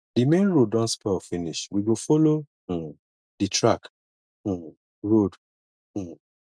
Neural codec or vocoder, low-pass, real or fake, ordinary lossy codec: none; none; real; none